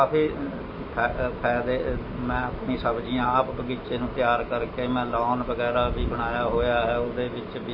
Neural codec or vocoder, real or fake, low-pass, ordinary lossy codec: none; real; 5.4 kHz; MP3, 24 kbps